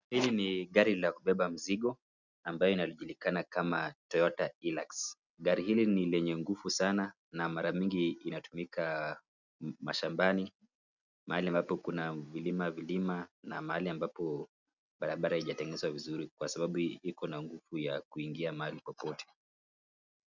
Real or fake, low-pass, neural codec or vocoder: real; 7.2 kHz; none